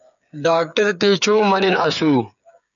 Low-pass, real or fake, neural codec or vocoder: 7.2 kHz; fake; codec, 16 kHz, 8 kbps, FreqCodec, smaller model